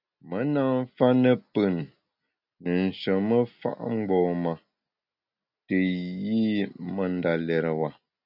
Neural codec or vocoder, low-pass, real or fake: none; 5.4 kHz; real